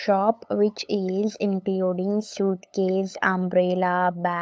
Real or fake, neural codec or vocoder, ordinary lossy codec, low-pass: fake; codec, 16 kHz, 8 kbps, FunCodec, trained on LibriTTS, 25 frames a second; none; none